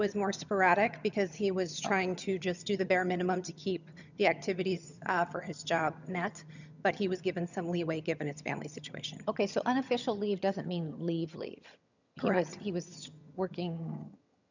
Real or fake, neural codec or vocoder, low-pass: fake; vocoder, 22.05 kHz, 80 mel bands, HiFi-GAN; 7.2 kHz